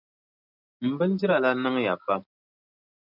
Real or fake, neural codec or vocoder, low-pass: real; none; 5.4 kHz